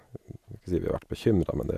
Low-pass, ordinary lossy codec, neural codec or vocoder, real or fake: 14.4 kHz; none; none; real